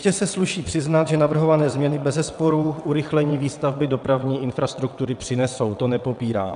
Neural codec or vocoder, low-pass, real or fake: vocoder, 22.05 kHz, 80 mel bands, WaveNeXt; 9.9 kHz; fake